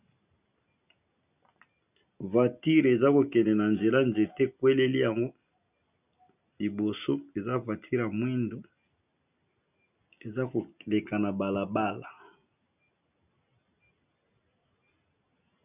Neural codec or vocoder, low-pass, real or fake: none; 3.6 kHz; real